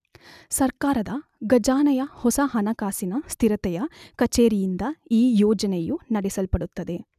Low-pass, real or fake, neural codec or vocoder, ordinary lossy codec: 14.4 kHz; real; none; none